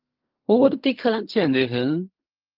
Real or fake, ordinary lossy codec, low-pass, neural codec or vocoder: fake; Opus, 32 kbps; 5.4 kHz; codec, 16 kHz in and 24 kHz out, 0.4 kbps, LongCat-Audio-Codec, fine tuned four codebook decoder